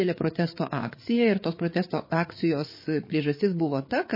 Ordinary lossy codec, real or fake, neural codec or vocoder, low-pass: MP3, 24 kbps; fake; codec, 16 kHz, 8 kbps, FreqCodec, larger model; 5.4 kHz